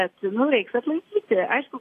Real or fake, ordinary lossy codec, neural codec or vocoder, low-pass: real; AAC, 48 kbps; none; 14.4 kHz